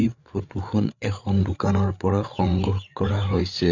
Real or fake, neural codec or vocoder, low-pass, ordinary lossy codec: fake; codec, 16 kHz, 8 kbps, FreqCodec, larger model; 7.2 kHz; none